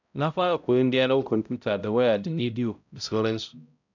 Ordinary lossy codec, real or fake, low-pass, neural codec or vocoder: none; fake; 7.2 kHz; codec, 16 kHz, 0.5 kbps, X-Codec, HuBERT features, trained on balanced general audio